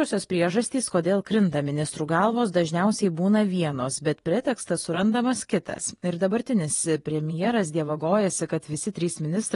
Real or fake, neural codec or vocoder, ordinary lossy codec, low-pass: fake; vocoder, 24 kHz, 100 mel bands, Vocos; AAC, 32 kbps; 10.8 kHz